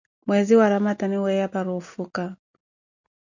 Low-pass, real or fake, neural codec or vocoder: 7.2 kHz; real; none